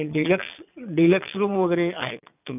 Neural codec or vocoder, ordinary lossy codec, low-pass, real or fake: vocoder, 22.05 kHz, 80 mel bands, Vocos; none; 3.6 kHz; fake